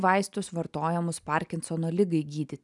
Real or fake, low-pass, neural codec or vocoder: real; 10.8 kHz; none